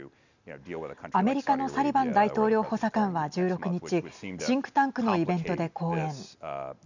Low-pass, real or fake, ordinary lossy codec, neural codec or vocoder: 7.2 kHz; real; none; none